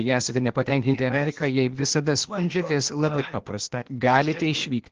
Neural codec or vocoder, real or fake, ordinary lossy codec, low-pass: codec, 16 kHz, 0.8 kbps, ZipCodec; fake; Opus, 16 kbps; 7.2 kHz